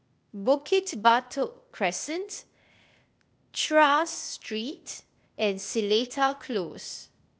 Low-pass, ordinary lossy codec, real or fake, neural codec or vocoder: none; none; fake; codec, 16 kHz, 0.8 kbps, ZipCodec